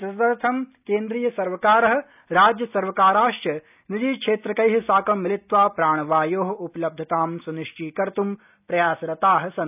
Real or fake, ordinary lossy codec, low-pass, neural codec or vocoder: real; none; 3.6 kHz; none